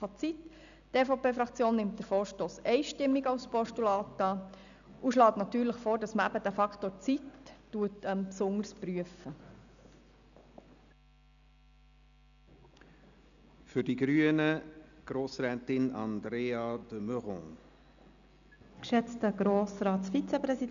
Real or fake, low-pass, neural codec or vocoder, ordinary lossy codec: real; 7.2 kHz; none; none